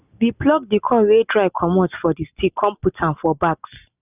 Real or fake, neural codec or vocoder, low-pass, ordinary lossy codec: real; none; 3.6 kHz; none